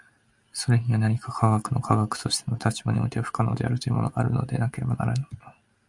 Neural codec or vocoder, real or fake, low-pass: none; real; 10.8 kHz